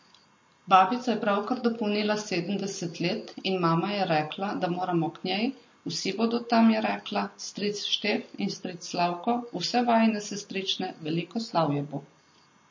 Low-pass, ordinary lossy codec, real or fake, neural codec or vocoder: 7.2 kHz; MP3, 32 kbps; real; none